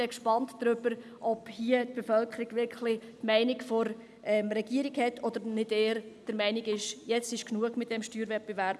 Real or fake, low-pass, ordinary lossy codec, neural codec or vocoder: real; none; none; none